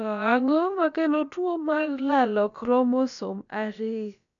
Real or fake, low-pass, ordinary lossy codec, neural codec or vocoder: fake; 7.2 kHz; none; codec, 16 kHz, about 1 kbps, DyCAST, with the encoder's durations